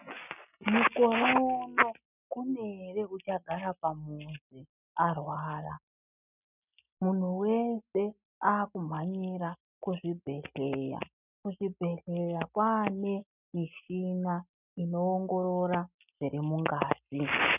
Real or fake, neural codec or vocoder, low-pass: real; none; 3.6 kHz